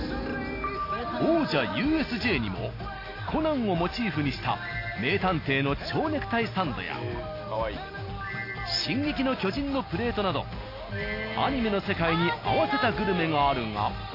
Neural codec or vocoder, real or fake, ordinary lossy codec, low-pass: none; real; AAC, 32 kbps; 5.4 kHz